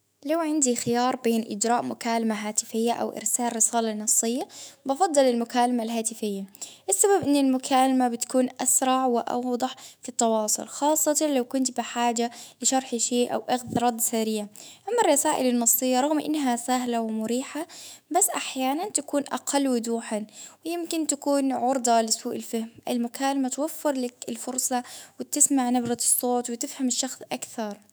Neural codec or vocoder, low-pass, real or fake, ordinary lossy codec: autoencoder, 48 kHz, 128 numbers a frame, DAC-VAE, trained on Japanese speech; none; fake; none